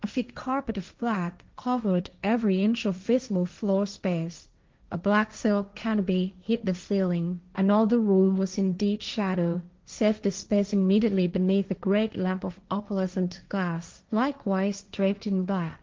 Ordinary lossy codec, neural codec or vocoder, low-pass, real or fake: Opus, 32 kbps; codec, 16 kHz, 1.1 kbps, Voila-Tokenizer; 7.2 kHz; fake